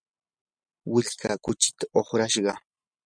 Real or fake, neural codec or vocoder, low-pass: real; none; 9.9 kHz